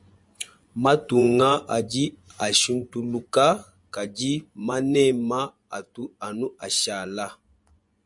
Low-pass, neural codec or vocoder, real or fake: 10.8 kHz; vocoder, 24 kHz, 100 mel bands, Vocos; fake